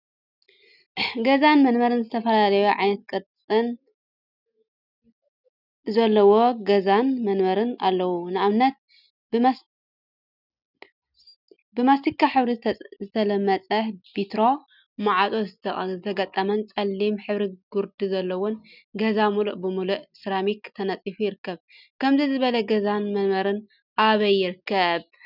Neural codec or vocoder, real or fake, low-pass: none; real; 5.4 kHz